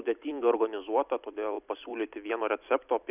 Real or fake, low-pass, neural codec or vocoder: fake; 3.6 kHz; vocoder, 44.1 kHz, 128 mel bands every 256 samples, BigVGAN v2